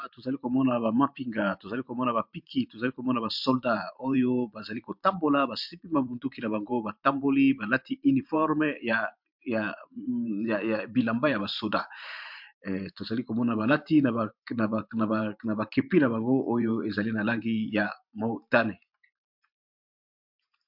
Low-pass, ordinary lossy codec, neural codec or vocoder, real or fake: 5.4 kHz; MP3, 48 kbps; vocoder, 44.1 kHz, 128 mel bands every 512 samples, BigVGAN v2; fake